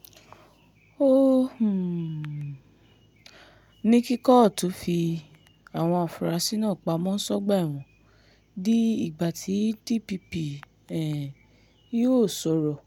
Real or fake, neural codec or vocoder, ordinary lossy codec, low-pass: real; none; MP3, 96 kbps; 19.8 kHz